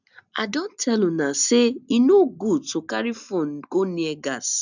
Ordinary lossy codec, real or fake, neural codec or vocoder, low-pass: none; real; none; 7.2 kHz